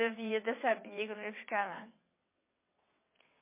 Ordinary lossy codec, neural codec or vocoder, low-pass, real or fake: MP3, 24 kbps; vocoder, 22.05 kHz, 80 mel bands, WaveNeXt; 3.6 kHz; fake